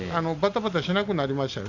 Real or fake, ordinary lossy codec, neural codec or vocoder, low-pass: real; none; none; 7.2 kHz